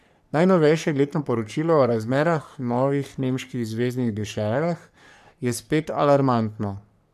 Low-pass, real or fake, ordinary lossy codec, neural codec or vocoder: 14.4 kHz; fake; none; codec, 44.1 kHz, 3.4 kbps, Pupu-Codec